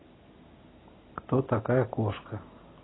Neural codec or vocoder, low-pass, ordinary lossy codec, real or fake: none; 7.2 kHz; AAC, 16 kbps; real